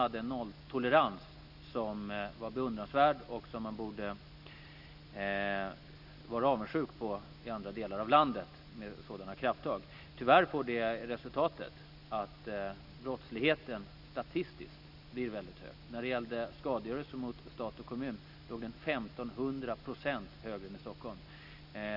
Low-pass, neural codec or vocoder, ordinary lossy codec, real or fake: 5.4 kHz; none; none; real